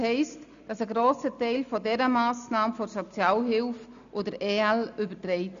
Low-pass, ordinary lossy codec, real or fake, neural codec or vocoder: 7.2 kHz; AAC, 96 kbps; real; none